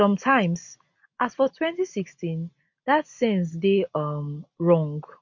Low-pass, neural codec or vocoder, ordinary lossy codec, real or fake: 7.2 kHz; none; MP3, 48 kbps; real